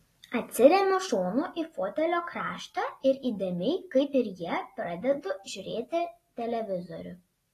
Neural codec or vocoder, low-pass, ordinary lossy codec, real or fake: none; 14.4 kHz; AAC, 48 kbps; real